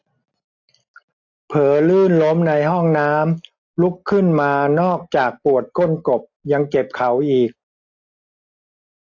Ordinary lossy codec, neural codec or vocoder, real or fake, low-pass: AAC, 48 kbps; none; real; 7.2 kHz